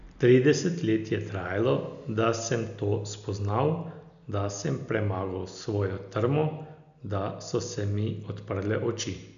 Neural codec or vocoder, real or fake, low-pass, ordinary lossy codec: none; real; 7.2 kHz; none